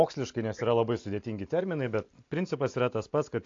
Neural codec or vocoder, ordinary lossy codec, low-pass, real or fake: none; AAC, 48 kbps; 7.2 kHz; real